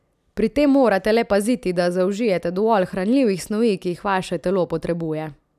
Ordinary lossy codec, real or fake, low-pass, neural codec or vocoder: none; real; 14.4 kHz; none